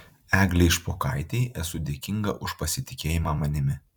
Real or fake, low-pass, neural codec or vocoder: fake; 19.8 kHz; vocoder, 44.1 kHz, 128 mel bands every 256 samples, BigVGAN v2